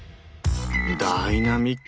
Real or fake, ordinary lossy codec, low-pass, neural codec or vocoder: real; none; none; none